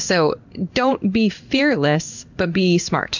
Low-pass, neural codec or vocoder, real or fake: 7.2 kHz; codec, 16 kHz in and 24 kHz out, 1 kbps, XY-Tokenizer; fake